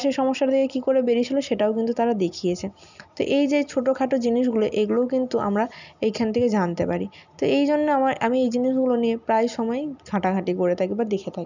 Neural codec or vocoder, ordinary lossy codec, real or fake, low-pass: none; none; real; 7.2 kHz